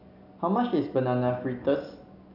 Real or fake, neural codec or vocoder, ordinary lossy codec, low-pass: real; none; none; 5.4 kHz